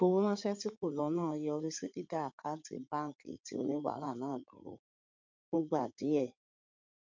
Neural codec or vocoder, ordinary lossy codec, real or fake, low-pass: codec, 16 kHz in and 24 kHz out, 2.2 kbps, FireRedTTS-2 codec; MP3, 64 kbps; fake; 7.2 kHz